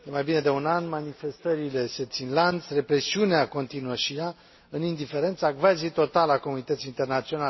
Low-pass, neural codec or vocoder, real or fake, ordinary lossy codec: 7.2 kHz; none; real; MP3, 24 kbps